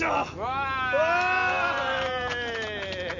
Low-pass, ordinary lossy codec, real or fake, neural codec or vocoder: 7.2 kHz; none; real; none